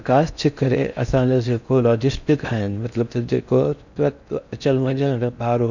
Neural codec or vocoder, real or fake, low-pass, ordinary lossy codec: codec, 16 kHz in and 24 kHz out, 0.6 kbps, FocalCodec, streaming, 4096 codes; fake; 7.2 kHz; none